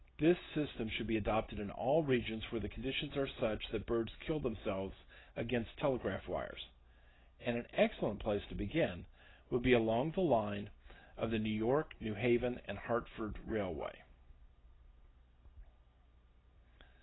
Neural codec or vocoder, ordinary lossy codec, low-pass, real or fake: none; AAC, 16 kbps; 7.2 kHz; real